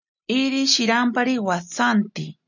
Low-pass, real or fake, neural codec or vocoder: 7.2 kHz; real; none